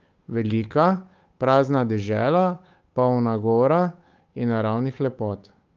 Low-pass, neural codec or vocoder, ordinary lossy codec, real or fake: 7.2 kHz; codec, 16 kHz, 8 kbps, FunCodec, trained on Chinese and English, 25 frames a second; Opus, 24 kbps; fake